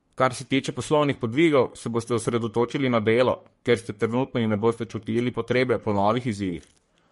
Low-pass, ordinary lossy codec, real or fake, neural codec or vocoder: 14.4 kHz; MP3, 48 kbps; fake; codec, 44.1 kHz, 3.4 kbps, Pupu-Codec